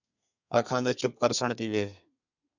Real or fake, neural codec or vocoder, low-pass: fake; codec, 32 kHz, 1.9 kbps, SNAC; 7.2 kHz